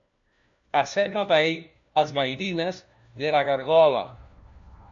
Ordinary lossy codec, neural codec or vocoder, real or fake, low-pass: AAC, 64 kbps; codec, 16 kHz, 1 kbps, FunCodec, trained on LibriTTS, 50 frames a second; fake; 7.2 kHz